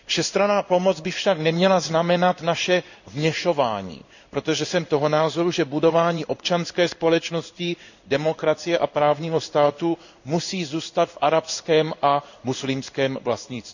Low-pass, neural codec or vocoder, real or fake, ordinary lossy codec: 7.2 kHz; codec, 16 kHz in and 24 kHz out, 1 kbps, XY-Tokenizer; fake; none